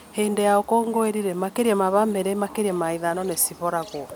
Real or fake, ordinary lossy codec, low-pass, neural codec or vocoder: real; none; none; none